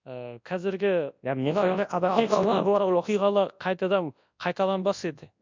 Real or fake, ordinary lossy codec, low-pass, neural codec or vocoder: fake; MP3, 64 kbps; 7.2 kHz; codec, 24 kHz, 0.9 kbps, WavTokenizer, large speech release